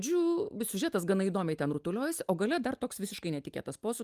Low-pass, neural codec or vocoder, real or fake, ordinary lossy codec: 14.4 kHz; none; real; Opus, 32 kbps